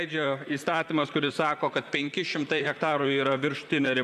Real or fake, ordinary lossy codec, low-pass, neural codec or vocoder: fake; MP3, 96 kbps; 14.4 kHz; vocoder, 44.1 kHz, 128 mel bands, Pupu-Vocoder